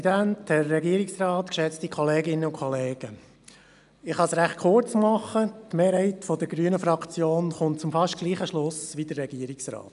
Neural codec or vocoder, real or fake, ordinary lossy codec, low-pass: none; real; none; 10.8 kHz